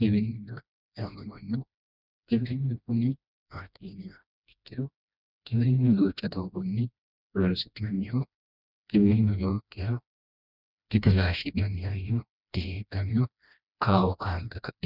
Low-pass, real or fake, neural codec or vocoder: 5.4 kHz; fake; codec, 16 kHz, 1 kbps, FreqCodec, smaller model